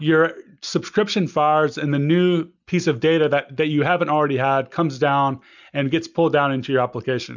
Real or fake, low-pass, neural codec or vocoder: real; 7.2 kHz; none